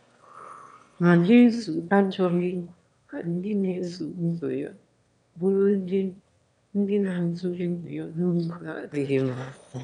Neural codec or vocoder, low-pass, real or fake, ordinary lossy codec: autoencoder, 22.05 kHz, a latent of 192 numbers a frame, VITS, trained on one speaker; 9.9 kHz; fake; none